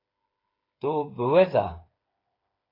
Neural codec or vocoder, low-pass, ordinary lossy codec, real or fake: codec, 16 kHz, 8 kbps, FreqCodec, smaller model; 5.4 kHz; AAC, 24 kbps; fake